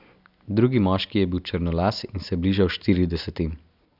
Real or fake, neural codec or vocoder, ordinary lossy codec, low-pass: real; none; none; 5.4 kHz